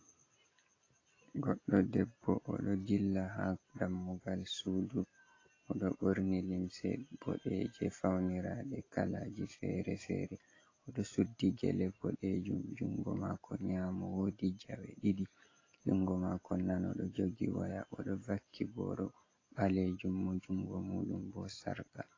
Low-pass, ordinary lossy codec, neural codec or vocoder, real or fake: 7.2 kHz; AAC, 32 kbps; none; real